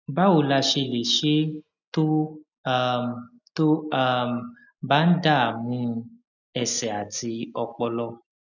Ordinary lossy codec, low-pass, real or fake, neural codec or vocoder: none; 7.2 kHz; real; none